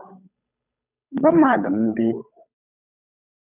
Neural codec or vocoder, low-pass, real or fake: codec, 16 kHz, 8 kbps, FunCodec, trained on Chinese and English, 25 frames a second; 3.6 kHz; fake